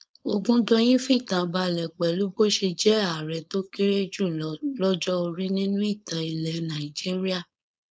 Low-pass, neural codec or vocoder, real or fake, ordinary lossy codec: none; codec, 16 kHz, 4.8 kbps, FACodec; fake; none